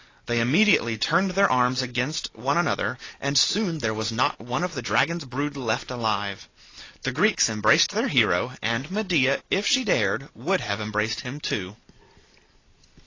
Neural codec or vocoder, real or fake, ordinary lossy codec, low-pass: none; real; AAC, 32 kbps; 7.2 kHz